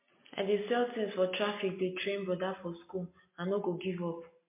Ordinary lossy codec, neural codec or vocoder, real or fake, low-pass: MP3, 24 kbps; none; real; 3.6 kHz